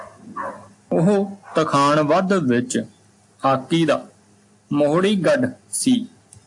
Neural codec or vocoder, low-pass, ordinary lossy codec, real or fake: none; 10.8 kHz; AAC, 64 kbps; real